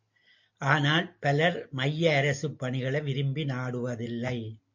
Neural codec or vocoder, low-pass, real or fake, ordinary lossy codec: none; 7.2 kHz; real; MP3, 48 kbps